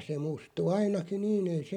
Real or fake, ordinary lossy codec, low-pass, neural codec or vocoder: real; none; 19.8 kHz; none